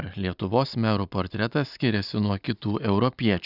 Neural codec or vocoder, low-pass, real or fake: none; 5.4 kHz; real